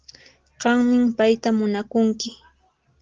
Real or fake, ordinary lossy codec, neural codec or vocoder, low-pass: real; Opus, 16 kbps; none; 7.2 kHz